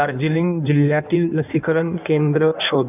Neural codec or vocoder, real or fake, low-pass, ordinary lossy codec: codec, 16 kHz in and 24 kHz out, 1.1 kbps, FireRedTTS-2 codec; fake; 3.6 kHz; none